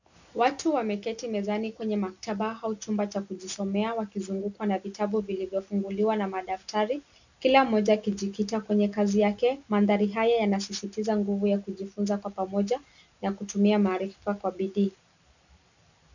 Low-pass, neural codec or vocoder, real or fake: 7.2 kHz; none; real